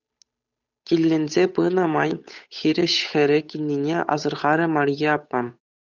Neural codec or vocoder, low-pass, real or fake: codec, 16 kHz, 8 kbps, FunCodec, trained on Chinese and English, 25 frames a second; 7.2 kHz; fake